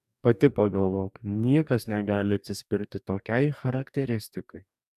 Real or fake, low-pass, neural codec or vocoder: fake; 14.4 kHz; codec, 44.1 kHz, 2.6 kbps, DAC